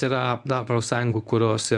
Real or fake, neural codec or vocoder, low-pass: fake; codec, 24 kHz, 0.9 kbps, WavTokenizer, medium speech release version 1; 10.8 kHz